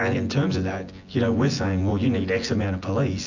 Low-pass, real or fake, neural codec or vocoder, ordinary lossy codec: 7.2 kHz; fake; vocoder, 24 kHz, 100 mel bands, Vocos; AAC, 48 kbps